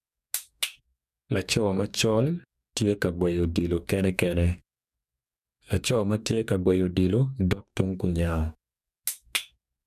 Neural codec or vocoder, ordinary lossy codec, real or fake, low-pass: codec, 44.1 kHz, 2.6 kbps, DAC; none; fake; 14.4 kHz